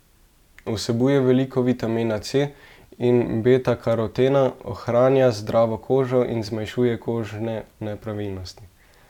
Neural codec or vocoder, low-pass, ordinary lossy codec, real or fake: none; 19.8 kHz; none; real